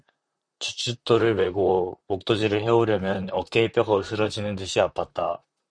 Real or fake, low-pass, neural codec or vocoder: fake; 9.9 kHz; vocoder, 44.1 kHz, 128 mel bands, Pupu-Vocoder